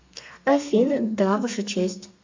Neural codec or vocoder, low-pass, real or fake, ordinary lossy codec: codec, 32 kHz, 1.9 kbps, SNAC; 7.2 kHz; fake; MP3, 48 kbps